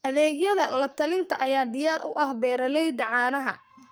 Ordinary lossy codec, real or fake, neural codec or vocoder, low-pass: none; fake; codec, 44.1 kHz, 2.6 kbps, SNAC; none